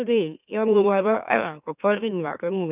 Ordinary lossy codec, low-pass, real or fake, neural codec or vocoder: none; 3.6 kHz; fake; autoencoder, 44.1 kHz, a latent of 192 numbers a frame, MeloTTS